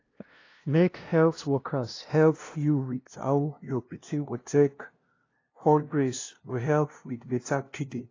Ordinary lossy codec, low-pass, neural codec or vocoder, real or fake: AAC, 32 kbps; 7.2 kHz; codec, 16 kHz, 0.5 kbps, FunCodec, trained on LibriTTS, 25 frames a second; fake